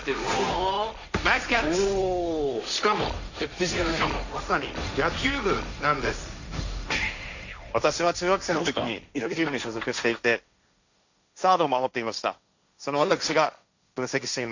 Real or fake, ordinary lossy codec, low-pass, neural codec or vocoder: fake; none; 7.2 kHz; codec, 16 kHz, 1.1 kbps, Voila-Tokenizer